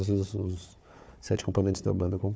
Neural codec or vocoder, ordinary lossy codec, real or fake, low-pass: codec, 16 kHz, 4 kbps, FunCodec, trained on Chinese and English, 50 frames a second; none; fake; none